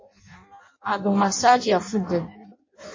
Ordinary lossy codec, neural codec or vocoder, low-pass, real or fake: MP3, 32 kbps; codec, 16 kHz in and 24 kHz out, 0.6 kbps, FireRedTTS-2 codec; 7.2 kHz; fake